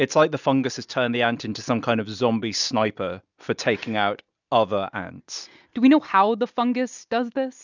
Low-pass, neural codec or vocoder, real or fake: 7.2 kHz; none; real